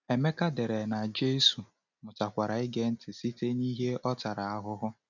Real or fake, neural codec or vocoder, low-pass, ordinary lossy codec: real; none; 7.2 kHz; none